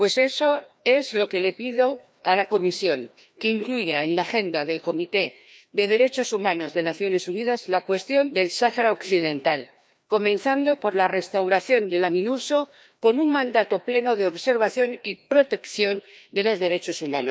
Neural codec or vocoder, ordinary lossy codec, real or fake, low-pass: codec, 16 kHz, 1 kbps, FreqCodec, larger model; none; fake; none